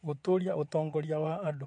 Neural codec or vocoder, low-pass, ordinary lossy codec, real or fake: vocoder, 22.05 kHz, 80 mel bands, WaveNeXt; 9.9 kHz; MP3, 48 kbps; fake